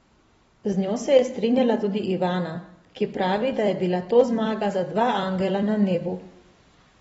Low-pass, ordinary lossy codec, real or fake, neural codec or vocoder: 19.8 kHz; AAC, 24 kbps; fake; vocoder, 44.1 kHz, 128 mel bands every 256 samples, BigVGAN v2